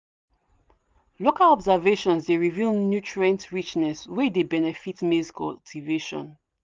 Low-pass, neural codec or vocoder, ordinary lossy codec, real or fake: 7.2 kHz; none; Opus, 24 kbps; real